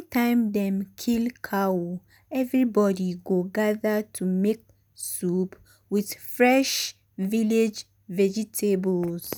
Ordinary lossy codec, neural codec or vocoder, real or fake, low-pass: none; none; real; none